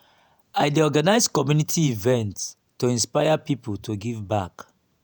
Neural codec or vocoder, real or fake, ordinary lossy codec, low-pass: vocoder, 48 kHz, 128 mel bands, Vocos; fake; none; none